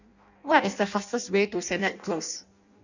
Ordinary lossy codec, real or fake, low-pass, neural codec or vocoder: none; fake; 7.2 kHz; codec, 16 kHz in and 24 kHz out, 0.6 kbps, FireRedTTS-2 codec